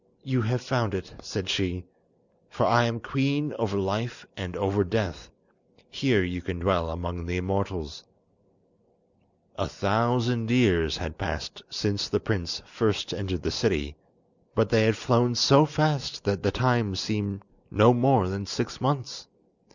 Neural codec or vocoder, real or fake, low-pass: none; real; 7.2 kHz